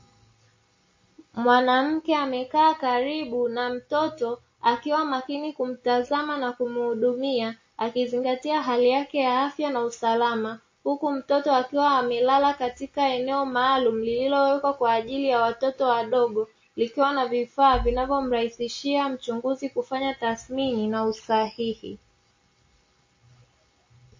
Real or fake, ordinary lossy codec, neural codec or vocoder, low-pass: real; MP3, 32 kbps; none; 7.2 kHz